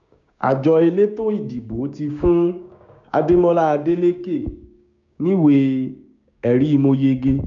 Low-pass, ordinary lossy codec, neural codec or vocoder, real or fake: 7.2 kHz; none; codec, 16 kHz, 6 kbps, DAC; fake